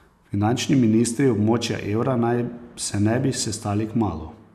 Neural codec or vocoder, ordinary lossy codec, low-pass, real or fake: none; none; 14.4 kHz; real